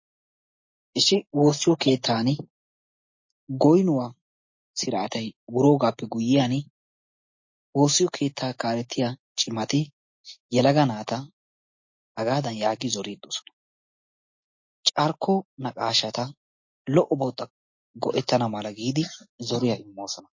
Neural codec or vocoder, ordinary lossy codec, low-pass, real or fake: none; MP3, 32 kbps; 7.2 kHz; real